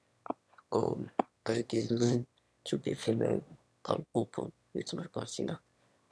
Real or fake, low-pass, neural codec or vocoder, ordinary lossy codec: fake; none; autoencoder, 22.05 kHz, a latent of 192 numbers a frame, VITS, trained on one speaker; none